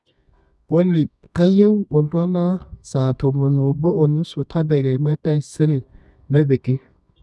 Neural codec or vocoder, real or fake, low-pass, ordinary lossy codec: codec, 24 kHz, 0.9 kbps, WavTokenizer, medium music audio release; fake; none; none